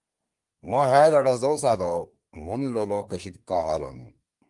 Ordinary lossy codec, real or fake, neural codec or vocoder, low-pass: Opus, 32 kbps; fake; codec, 24 kHz, 1 kbps, SNAC; 10.8 kHz